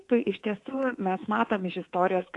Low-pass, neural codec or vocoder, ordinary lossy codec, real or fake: 10.8 kHz; codec, 24 kHz, 3.1 kbps, DualCodec; AAC, 48 kbps; fake